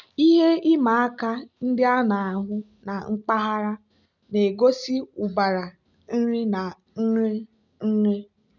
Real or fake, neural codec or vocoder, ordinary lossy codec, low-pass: real; none; none; 7.2 kHz